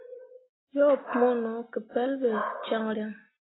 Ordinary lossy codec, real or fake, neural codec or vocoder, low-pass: AAC, 16 kbps; real; none; 7.2 kHz